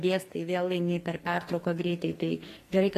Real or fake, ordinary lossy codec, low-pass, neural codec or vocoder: fake; AAC, 48 kbps; 14.4 kHz; codec, 44.1 kHz, 2.6 kbps, SNAC